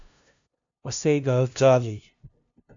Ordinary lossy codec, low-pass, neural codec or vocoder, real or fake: AAC, 64 kbps; 7.2 kHz; codec, 16 kHz, 0.5 kbps, FunCodec, trained on LibriTTS, 25 frames a second; fake